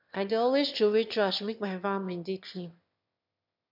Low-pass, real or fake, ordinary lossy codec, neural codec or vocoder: 5.4 kHz; fake; MP3, 48 kbps; autoencoder, 22.05 kHz, a latent of 192 numbers a frame, VITS, trained on one speaker